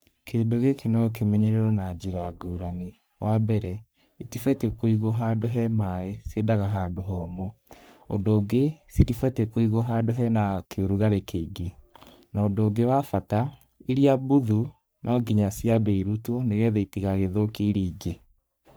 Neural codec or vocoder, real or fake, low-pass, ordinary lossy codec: codec, 44.1 kHz, 3.4 kbps, Pupu-Codec; fake; none; none